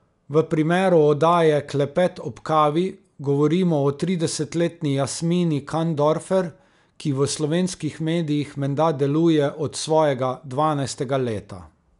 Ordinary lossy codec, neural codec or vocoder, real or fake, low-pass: none; none; real; 10.8 kHz